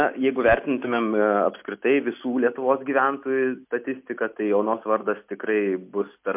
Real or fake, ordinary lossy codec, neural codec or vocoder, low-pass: real; MP3, 32 kbps; none; 3.6 kHz